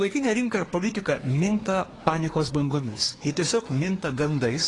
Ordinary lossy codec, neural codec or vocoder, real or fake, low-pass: AAC, 32 kbps; codec, 24 kHz, 1 kbps, SNAC; fake; 10.8 kHz